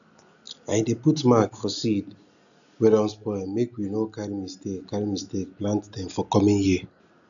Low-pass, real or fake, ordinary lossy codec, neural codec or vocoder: 7.2 kHz; real; none; none